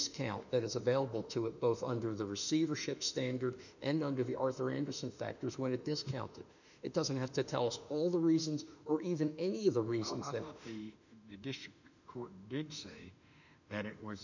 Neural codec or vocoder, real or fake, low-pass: autoencoder, 48 kHz, 32 numbers a frame, DAC-VAE, trained on Japanese speech; fake; 7.2 kHz